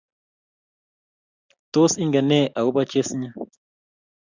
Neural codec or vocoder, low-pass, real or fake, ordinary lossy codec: none; 7.2 kHz; real; Opus, 64 kbps